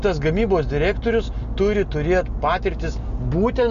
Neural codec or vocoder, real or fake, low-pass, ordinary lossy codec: none; real; 7.2 kHz; AAC, 96 kbps